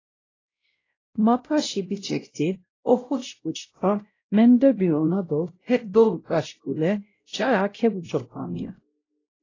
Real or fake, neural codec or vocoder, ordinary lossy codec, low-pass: fake; codec, 16 kHz, 0.5 kbps, X-Codec, WavLM features, trained on Multilingual LibriSpeech; AAC, 32 kbps; 7.2 kHz